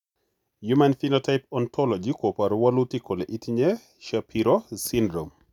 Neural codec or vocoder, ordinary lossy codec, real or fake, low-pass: none; none; real; 19.8 kHz